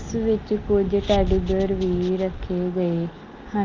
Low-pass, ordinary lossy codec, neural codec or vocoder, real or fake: 7.2 kHz; Opus, 32 kbps; none; real